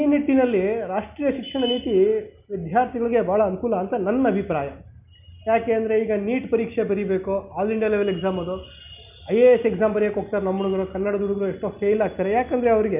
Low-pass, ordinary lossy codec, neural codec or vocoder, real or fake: 3.6 kHz; none; none; real